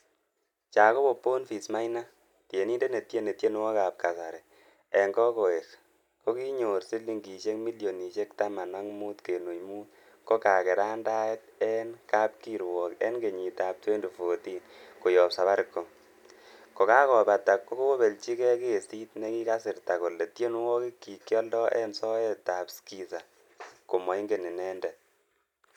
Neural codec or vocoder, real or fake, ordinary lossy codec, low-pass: none; real; none; 19.8 kHz